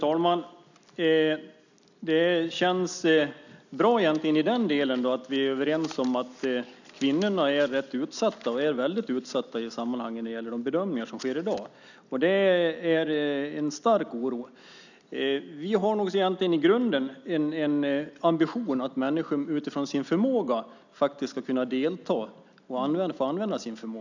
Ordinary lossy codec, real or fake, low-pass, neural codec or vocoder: none; real; 7.2 kHz; none